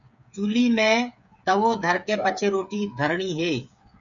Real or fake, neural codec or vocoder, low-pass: fake; codec, 16 kHz, 8 kbps, FreqCodec, smaller model; 7.2 kHz